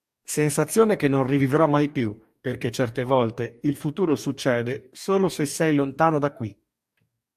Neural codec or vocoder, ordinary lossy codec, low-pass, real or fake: codec, 44.1 kHz, 2.6 kbps, DAC; AAC, 96 kbps; 14.4 kHz; fake